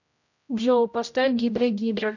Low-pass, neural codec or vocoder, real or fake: 7.2 kHz; codec, 16 kHz, 0.5 kbps, X-Codec, HuBERT features, trained on general audio; fake